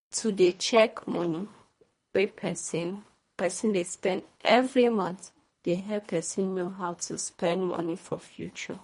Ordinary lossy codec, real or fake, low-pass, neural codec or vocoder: MP3, 48 kbps; fake; 10.8 kHz; codec, 24 kHz, 1.5 kbps, HILCodec